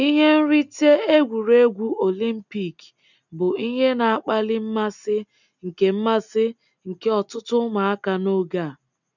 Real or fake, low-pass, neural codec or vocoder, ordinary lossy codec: real; 7.2 kHz; none; none